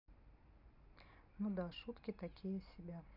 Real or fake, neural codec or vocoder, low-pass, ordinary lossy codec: real; none; 5.4 kHz; none